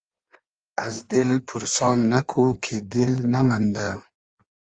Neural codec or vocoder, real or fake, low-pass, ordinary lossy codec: codec, 16 kHz in and 24 kHz out, 1.1 kbps, FireRedTTS-2 codec; fake; 9.9 kHz; Opus, 32 kbps